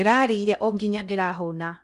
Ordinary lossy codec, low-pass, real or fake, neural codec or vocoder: none; 10.8 kHz; fake; codec, 16 kHz in and 24 kHz out, 0.6 kbps, FocalCodec, streaming, 2048 codes